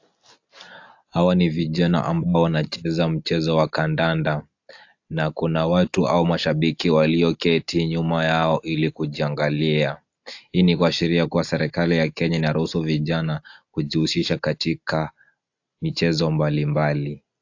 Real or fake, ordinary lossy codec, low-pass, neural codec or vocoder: real; AAC, 48 kbps; 7.2 kHz; none